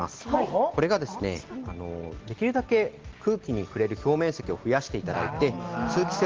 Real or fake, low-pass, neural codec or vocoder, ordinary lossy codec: real; 7.2 kHz; none; Opus, 16 kbps